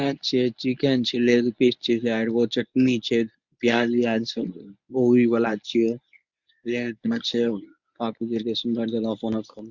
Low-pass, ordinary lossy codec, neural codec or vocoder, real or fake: 7.2 kHz; Opus, 64 kbps; codec, 24 kHz, 0.9 kbps, WavTokenizer, medium speech release version 1; fake